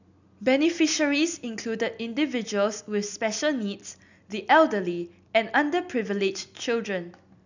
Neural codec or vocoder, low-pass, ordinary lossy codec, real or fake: none; 7.2 kHz; none; real